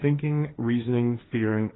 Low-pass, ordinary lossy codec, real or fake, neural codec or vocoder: 7.2 kHz; AAC, 16 kbps; fake; codec, 16 kHz, 1.1 kbps, Voila-Tokenizer